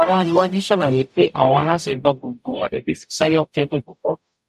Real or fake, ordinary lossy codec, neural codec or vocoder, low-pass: fake; none; codec, 44.1 kHz, 0.9 kbps, DAC; 14.4 kHz